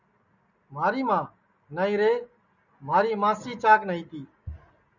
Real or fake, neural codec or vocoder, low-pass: real; none; 7.2 kHz